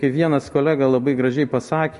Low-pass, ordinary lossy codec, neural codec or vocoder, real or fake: 14.4 kHz; MP3, 48 kbps; none; real